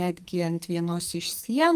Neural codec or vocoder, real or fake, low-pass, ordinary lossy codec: codec, 44.1 kHz, 2.6 kbps, SNAC; fake; 14.4 kHz; Opus, 32 kbps